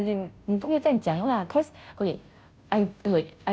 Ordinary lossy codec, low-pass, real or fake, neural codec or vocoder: none; none; fake; codec, 16 kHz, 0.5 kbps, FunCodec, trained on Chinese and English, 25 frames a second